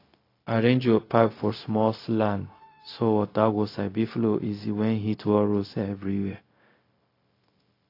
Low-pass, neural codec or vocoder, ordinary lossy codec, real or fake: 5.4 kHz; codec, 16 kHz, 0.4 kbps, LongCat-Audio-Codec; AAC, 32 kbps; fake